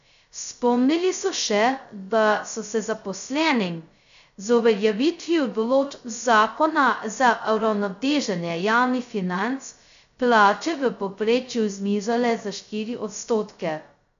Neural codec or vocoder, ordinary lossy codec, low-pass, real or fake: codec, 16 kHz, 0.2 kbps, FocalCodec; AAC, 96 kbps; 7.2 kHz; fake